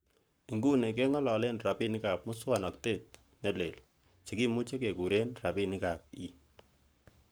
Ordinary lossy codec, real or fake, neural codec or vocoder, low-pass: none; fake; codec, 44.1 kHz, 7.8 kbps, Pupu-Codec; none